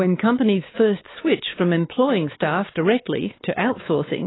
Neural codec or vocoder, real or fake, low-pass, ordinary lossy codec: codec, 16 kHz, 4 kbps, X-Codec, WavLM features, trained on Multilingual LibriSpeech; fake; 7.2 kHz; AAC, 16 kbps